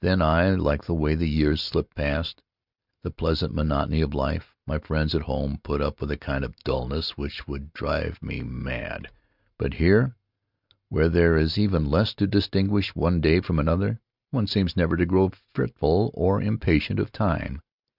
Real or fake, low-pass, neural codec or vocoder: real; 5.4 kHz; none